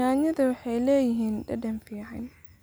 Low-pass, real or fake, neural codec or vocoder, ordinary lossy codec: none; real; none; none